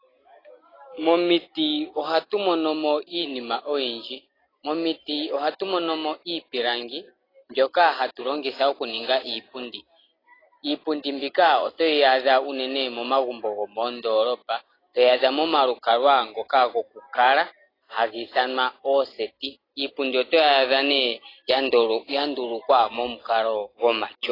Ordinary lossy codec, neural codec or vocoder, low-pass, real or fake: AAC, 24 kbps; none; 5.4 kHz; real